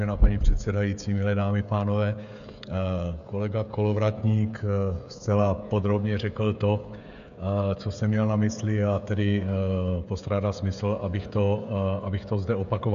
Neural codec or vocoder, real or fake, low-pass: codec, 16 kHz, 16 kbps, FreqCodec, smaller model; fake; 7.2 kHz